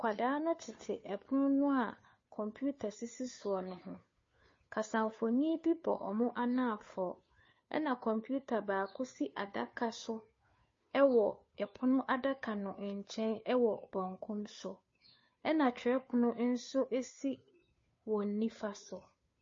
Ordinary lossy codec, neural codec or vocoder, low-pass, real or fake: MP3, 32 kbps; codec, 16 kHz, 2 kbps, FunCodec, trained on Chinese and English, 25 frames a second; 7.2 kHz; fake